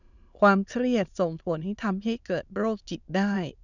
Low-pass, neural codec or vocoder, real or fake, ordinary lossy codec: 7.2 kHz; autoencoder, 22.05 kHz, a latent of 192 numbers a frame, VITS, trained on many speakers; fake; none